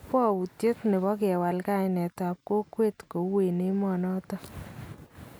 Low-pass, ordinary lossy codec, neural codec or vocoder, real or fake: none; none; none; real